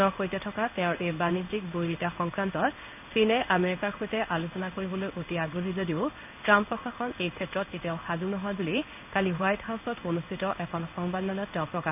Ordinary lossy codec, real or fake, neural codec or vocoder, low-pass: none; fake; codec, 16 kHz in and 24 kHz out, 1 kbps, XY-Tokenizer; 3.6 kHz